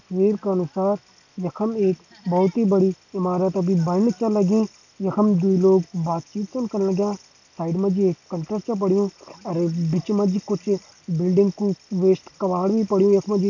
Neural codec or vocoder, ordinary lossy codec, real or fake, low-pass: none; AAC, 48 kbps; real; 7.2 kHz